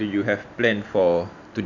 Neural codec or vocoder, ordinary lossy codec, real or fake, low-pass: vocoder, 44.1 kHz, 128 mel bands every 512 samples, BigVGAN v2; none; fake; 7.2 kHz